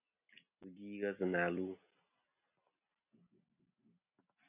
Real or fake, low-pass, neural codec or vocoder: real; 3.6 kHz; none